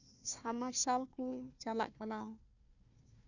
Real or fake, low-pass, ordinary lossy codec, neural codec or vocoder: fake; 7.2 kHz; none; codec, 16 kHz, 1 kbps, FunCodec, trained on Chinese and English, 50 frames a second